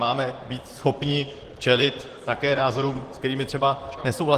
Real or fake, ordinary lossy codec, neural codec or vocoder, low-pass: fake; Opus, 16 kbps; vocoder, 44.1 kHz, 128 mel bands, Pupu-Vocoder; 14.4 kHz